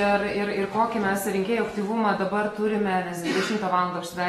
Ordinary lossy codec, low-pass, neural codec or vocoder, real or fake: AAC, 32 kbps; 19.8 kHz; none; real